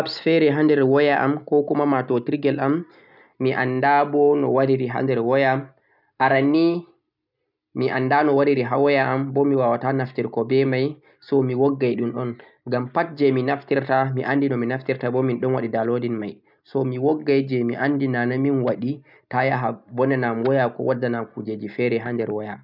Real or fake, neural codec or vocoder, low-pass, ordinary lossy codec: real; none; 5.4 kHz; none